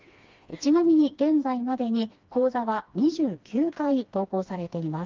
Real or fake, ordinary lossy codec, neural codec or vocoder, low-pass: fake; Opus, 32 kbps; codec, 16 kHz, 2 kbps, FreqCodec, smaller model; 7.2 kHz